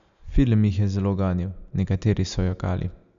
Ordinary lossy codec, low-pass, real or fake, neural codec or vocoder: none; 7.2 kHz; real; none